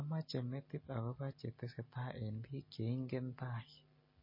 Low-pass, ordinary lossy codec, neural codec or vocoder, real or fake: 5.4 kHz; MP3, 24 kbps; none; real